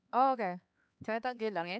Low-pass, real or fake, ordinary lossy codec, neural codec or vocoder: none; fake; none; codec, 16 kHz, 4 kbps, X-Codec, HuBERT features, trained on LibriSpeech